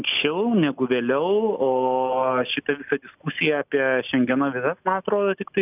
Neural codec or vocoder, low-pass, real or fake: none; 3.6 kHz; real